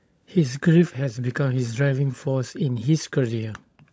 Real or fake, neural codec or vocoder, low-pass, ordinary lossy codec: fake; codec, 16 kHz, 16 kbps, FunCodec, trained on LibriTTS, 50 frames a second; none; none